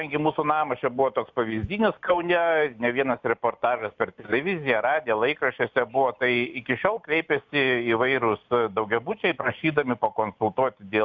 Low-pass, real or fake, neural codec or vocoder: 7.2 kHz; real; none